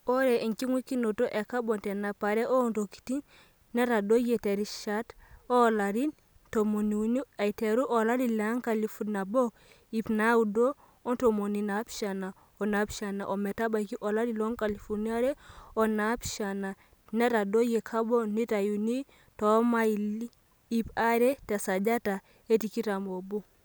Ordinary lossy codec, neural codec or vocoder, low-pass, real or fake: none; none; none; real